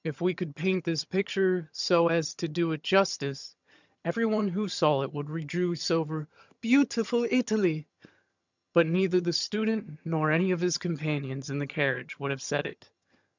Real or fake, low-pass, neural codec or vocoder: fake; 7.2 kHz; vocoder, 22.05 kHz, 80 mel bands, HiFi-GAN